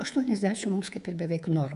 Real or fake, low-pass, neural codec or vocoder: fake; 10.8 kHz; codec, 24 kHz, 3.1 kbps, DualCodec